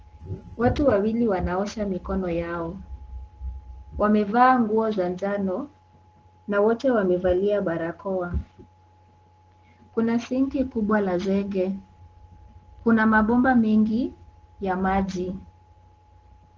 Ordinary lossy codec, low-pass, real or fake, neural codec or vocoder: Opus, 16 kbps; 7.2 kHz; real; none